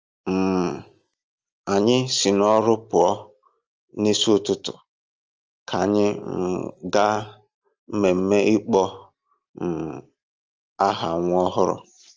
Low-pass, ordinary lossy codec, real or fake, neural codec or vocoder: 7.2 kHz; Opus, 24 kbps; real; none